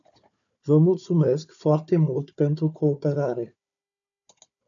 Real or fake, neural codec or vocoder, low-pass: fake; codec, 16 kHz, 4 kbps, FunCodec, trained on Chinese and English, 50 frames a second; 7.2 kHz